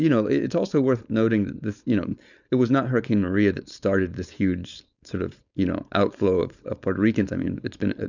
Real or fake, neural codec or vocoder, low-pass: fake; codec, 16 kHz, 4.8 kbps, FACodec; 7.2 kHz